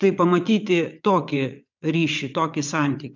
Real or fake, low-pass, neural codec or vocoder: fake; 7.2 kHz; vocoder, 22.05 kHz, 80 mel bands, WaveNeXt